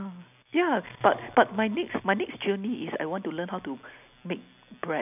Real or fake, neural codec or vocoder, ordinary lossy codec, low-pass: real; none; none; 3.6 kHz